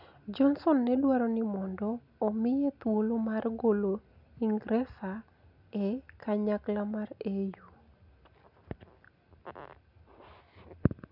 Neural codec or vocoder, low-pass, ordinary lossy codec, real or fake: none; 5.4 kHz; none; real